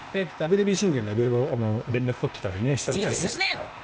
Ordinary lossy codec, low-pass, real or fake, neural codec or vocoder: none; none; fake; codec, 16 kHz, 0.8 kbps, ZipCodec